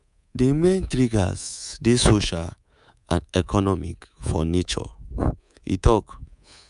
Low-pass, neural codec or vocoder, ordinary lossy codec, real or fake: 10.8 kHz; codec, 24 kHz, 3.1 kbps, DualCodec; none; fake